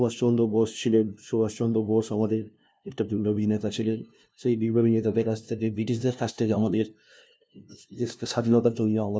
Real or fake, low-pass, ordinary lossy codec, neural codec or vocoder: fake; none; none; codec, 16 kHz, 0.5 kbps, FunCodec, trained on LibriTTS, 25 frames a second